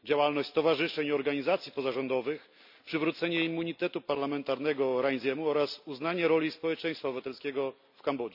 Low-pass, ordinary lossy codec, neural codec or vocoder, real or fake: 5.4 kHz; none; none; real